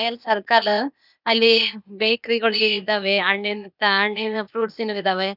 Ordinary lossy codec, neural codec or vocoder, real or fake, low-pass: none; codec, 16 kHz, 0.8 kbps, ZipCodec; fake; 5.4 kHz